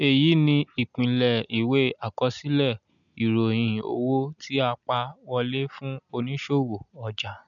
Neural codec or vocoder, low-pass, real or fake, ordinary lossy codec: none; 7.2 kHz; real; MP3, 96 kbps